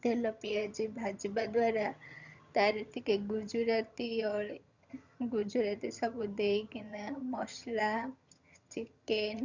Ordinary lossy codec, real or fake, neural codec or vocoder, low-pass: Opus, 64 kbps; fake; vocoder, 22.05 kHz, 80 mel bands, HiFi-GAN; 7.2 kHz